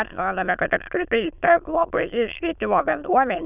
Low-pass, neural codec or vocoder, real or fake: 3.6 kHz; autoencoder, 22.05 kHz, a latent of 192 numbers a frame, VITS, trained on many speakers; fake